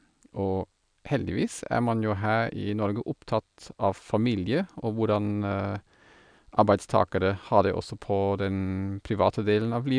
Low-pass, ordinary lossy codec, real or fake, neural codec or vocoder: 9.9 kHz; none; real; none